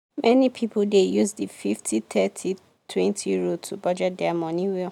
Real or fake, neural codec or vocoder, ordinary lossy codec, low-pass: real; none; none; 19.8 kHz